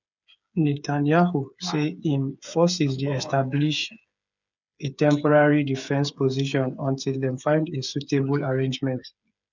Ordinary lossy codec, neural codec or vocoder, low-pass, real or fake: none; codec, 16 kHz, 8 kbps, FreqCodec, smaller model; 7.2 kHz; fake